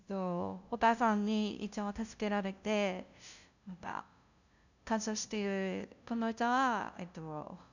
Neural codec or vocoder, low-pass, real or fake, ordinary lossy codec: codec, 16 kHz, 0.5 kbps, FunCodec, trained on LibriTTS, 25 frames a second; 7.2 kHz; fake; none